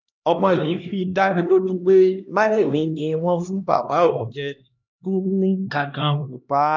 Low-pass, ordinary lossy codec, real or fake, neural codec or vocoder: 7.2 kHz; none; fake; codec, 16 kHz, 1 kbps, X-Codec, HuBERT features, trained on LibriSpeech